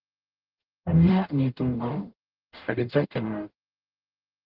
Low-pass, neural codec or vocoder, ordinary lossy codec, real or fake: 5.4 kHz; codec, 44.1 kHz, 0.9 kbps, DAC; Opus, 16 kbps; fake